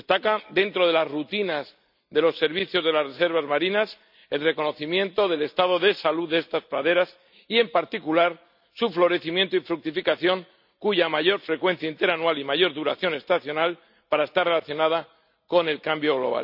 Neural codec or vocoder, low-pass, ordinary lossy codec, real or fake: none; 5.4 kHz; none; real